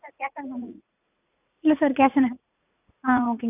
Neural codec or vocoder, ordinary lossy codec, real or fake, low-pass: vocoder, 44.1 kHz, 80 mel bands, Vocos; none; fake; 3.6 kHz